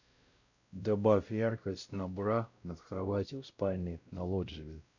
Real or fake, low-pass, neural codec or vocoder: fake; 7.2 kHz; codec, 16 kHz, 0.5 kbps, X-Codec, WavLM features, trained on Multilingual LibriSpeech